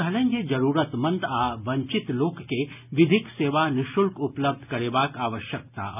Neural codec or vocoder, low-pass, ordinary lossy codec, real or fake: none; 3.6 kHz; none; real